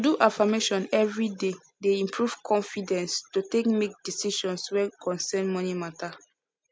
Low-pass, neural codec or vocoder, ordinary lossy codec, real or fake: none; none; none; real